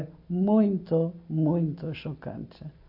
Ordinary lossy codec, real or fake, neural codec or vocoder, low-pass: AAC, 48 kbps; real; none; 5.4 kHz